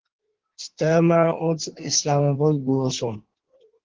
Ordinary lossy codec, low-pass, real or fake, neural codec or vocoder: Opus, 16 kbps; 7.2 kHz; fake; codec, 16 kHz in and 24 kHz out, 1.1 kbps, FireRedTTS-2 codec